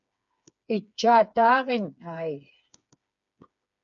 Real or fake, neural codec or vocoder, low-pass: fake; codec, 16 kHz, 4 kbps, FreqCodec, smaller model; 7.2 kHz